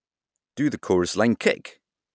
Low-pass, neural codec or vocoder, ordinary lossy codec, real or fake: none; none; none; real